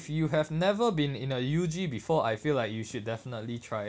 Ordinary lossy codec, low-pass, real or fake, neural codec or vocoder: none; none; real; none